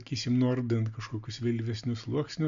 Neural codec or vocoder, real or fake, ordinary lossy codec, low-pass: none; real; AAC, 64 kbps; 7.2 kHz